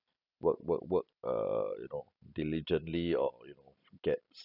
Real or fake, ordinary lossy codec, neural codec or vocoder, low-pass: real; none; none; 5.4 kHz